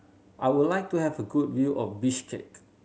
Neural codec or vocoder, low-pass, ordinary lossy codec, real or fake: none; none; none; real